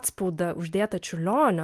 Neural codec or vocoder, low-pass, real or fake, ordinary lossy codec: none; 14.4 kHz; real; Opus, 32 kbps